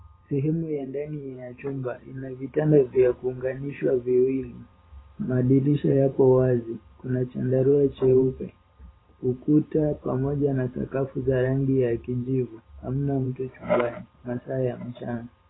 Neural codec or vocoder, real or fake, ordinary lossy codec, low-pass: vocoder, 44.1 kHz, 128 mel bands every 512 samples, BigVGAN v2; fake; AAC, 16 kbps; 7.2 kHz